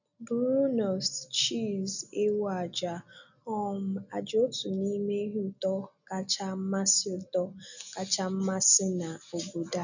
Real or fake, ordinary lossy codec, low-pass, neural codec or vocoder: real; none; 7.2 kHz; none